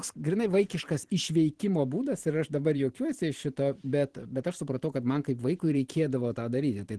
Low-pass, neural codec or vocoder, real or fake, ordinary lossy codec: 10.8 kHz; vocoder, 44.1 kHz, 128 mel bands every 512 samples, BigVGAN v2; fake; Opus, 16 kbps